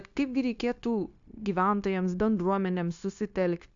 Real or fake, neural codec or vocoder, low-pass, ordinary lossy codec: fake; codec, 16 kHz, 0.9 kbps, LongCat-Audio-Codec; 7.2 kHz; AAC, 64 kbps